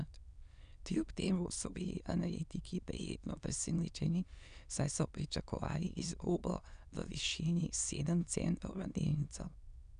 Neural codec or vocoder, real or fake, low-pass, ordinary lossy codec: autoencoder, 22.05 kHz, a latent of 192 numbers a frame, VITS, trained on many speakers; fake; 9.9 kHz; none